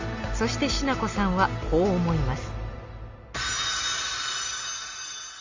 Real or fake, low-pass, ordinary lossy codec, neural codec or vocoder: real; 7.2 kHz; Opus, 32 kbps; none